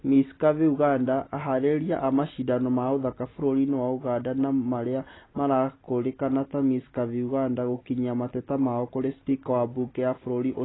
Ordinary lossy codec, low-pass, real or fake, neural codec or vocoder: AAC, 16 kbps; 7.2 kHz; real; none